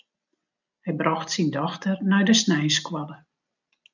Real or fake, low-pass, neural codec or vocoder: real; 7.2 kHz; none